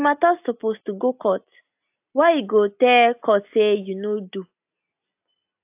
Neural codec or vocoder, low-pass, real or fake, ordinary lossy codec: none; 3.6 kHz; real; AAC, 32 kbps